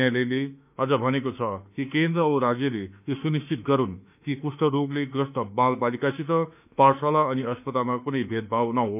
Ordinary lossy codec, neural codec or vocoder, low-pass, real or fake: none; autoencoder, 48 kHz, 32 numbers a frame, DAC-VAE, trained on Japanese speech; 3.6 kHz; fake